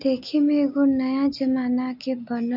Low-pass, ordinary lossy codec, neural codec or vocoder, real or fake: 5.4 kHz; none; none; real